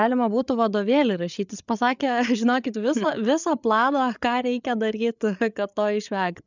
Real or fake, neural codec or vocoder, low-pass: fake; codec, 16 kHz, 8 kbps, FreqCodec, larger model; 7.2 kHz